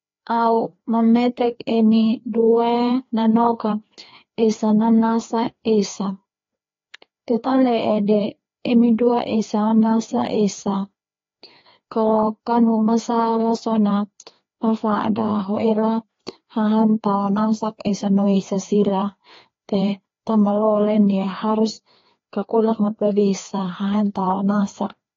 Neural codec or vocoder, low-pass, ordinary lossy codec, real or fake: codec, 16 kHz, 2 kbps, FreqCodec, larger model; 7.2 kHz; AAC, 32 kbps; fake